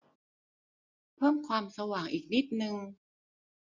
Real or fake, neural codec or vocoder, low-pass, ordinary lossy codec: real; none; 7.2 kHz; MP3, 64 kbps